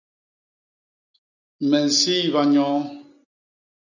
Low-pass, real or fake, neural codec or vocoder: 7.2 kHz; real; none